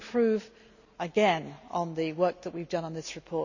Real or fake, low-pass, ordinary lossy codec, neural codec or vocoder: real; 7.2 kHz; none; none